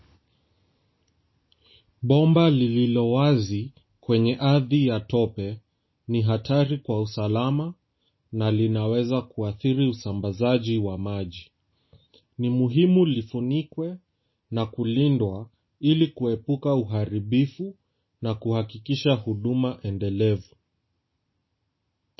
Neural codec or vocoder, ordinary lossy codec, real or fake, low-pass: none; MP3, 24 kbps; real; 7.2 kHz